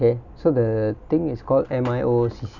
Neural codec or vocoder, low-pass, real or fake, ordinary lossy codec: none; 7.2 kHz; real; none